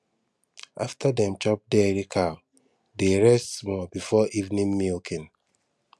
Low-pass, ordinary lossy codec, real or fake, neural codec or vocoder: none; none; real; none